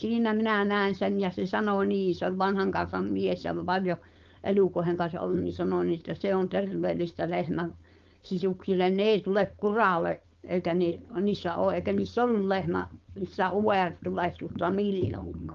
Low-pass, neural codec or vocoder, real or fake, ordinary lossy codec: 7.2 kHz; codec, 16 kHz, 4.8 kbps, FACodec; fake; Opus, 32 kbps